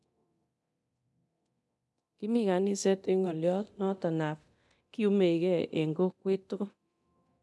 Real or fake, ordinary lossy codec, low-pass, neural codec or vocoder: fake; none; none; codec, 24 kHz, 0.9 kbps, DualCodec